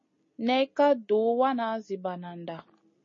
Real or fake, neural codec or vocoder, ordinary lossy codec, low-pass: real; none; MP3, 32 kbps; 7.2 kHz